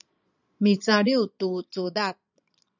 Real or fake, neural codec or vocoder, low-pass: fake; vocoder, 22.05 kHz, 80 mel bands, Vocos; 7.2 kHz